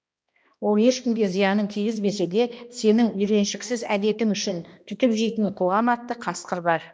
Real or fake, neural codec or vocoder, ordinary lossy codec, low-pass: fake; codec, 16 kHz, 1 kbps, X-Codec, HuBERT features, trained on balanced general audio; none; none